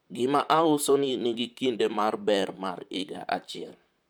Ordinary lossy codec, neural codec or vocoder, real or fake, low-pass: none; vocoder, 44.1 kHz, 128 mel bands, Pupu-Vocoder; fake; none